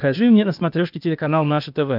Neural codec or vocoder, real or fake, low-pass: codec, 16 kHz, 0.8 kbps, ZipCodec; fake; 5.4 kHz